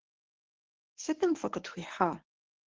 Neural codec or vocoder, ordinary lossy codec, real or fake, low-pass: codec, 24 kHz, 0.9 kbps, WavTokenizer, medium speech release version 1; Opus, 16 kbps; fake; 7.2 kHz